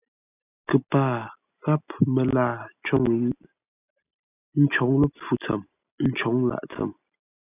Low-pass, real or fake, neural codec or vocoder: 3.6 kHz; real; none